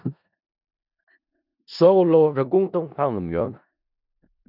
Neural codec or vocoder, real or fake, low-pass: codec, 16 kHz in and 24 kHz out, 0.4 kbps, LongCat-Audio-Codec, four codebook decoder; fake; 5.4 kHz